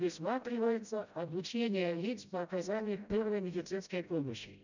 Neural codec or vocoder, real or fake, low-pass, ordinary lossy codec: codec, 16 kHz, 0.5 kbps, FreqCodec, smaller model; fake; 7.2 kHz; none